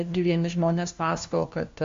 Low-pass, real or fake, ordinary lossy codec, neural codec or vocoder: 7.2 kHz; fake; MP3, 48 kbps; codec, 16 kHz, 1 kbps, FunCodec, trained on LibriTTS, 50 frames a second